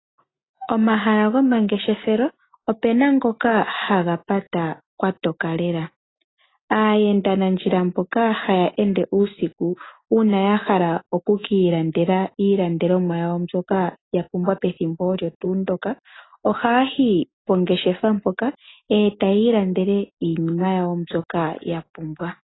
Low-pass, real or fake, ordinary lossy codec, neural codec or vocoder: 7.2 kHz; real; AAC, 16 kbps; none